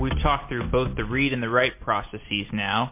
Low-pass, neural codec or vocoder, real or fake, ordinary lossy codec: 3.6 kHz; none; real; MP3, 24 kbps